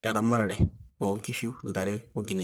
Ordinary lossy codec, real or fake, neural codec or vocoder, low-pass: none; fake; codec, 44.1 kHz, 1.7 kbps, Pupu-Codec; none